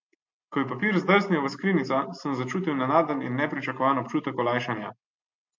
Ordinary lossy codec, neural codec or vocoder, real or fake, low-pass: MP3, 48 kbps; none; real; 7.2 kHz